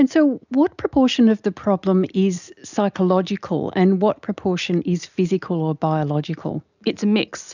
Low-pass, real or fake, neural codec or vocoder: 7.2 kHz; real; none